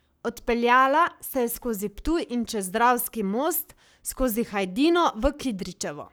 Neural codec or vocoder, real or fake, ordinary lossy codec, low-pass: codec, 44.1 kHz, 7.8 kbps, Pupu-Codec; fake; none; none